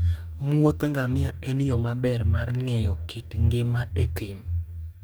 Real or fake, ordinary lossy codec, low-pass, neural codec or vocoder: fake; none; none; codec, 44.1 kHz, 2.6 kbps, DAC